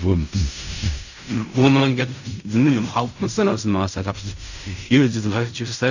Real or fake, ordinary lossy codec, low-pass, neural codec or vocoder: fake; none; 7.2 kHz; codec, 16 kHz in and 24 kHz out, 0.4 kbps, LongCat-Audio-Codec, fine tuned four codebook decoder